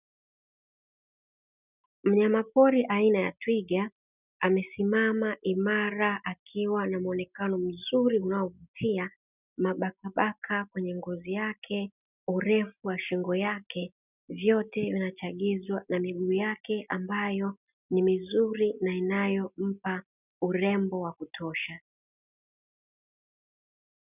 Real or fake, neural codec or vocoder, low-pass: real; none; 3.6 kHz